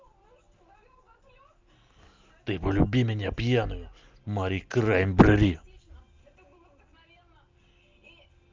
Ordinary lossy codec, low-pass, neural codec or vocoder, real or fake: Opus, 24 kbps; 7.2 kHz; none; real